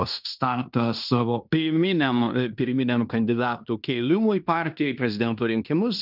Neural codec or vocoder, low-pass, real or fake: codec, 16 kHz in and 24 kHz out, 0.9 kbps, LongCat-Audio-Codec, fine tuned four codebook decoder; 5.4 kHz; fake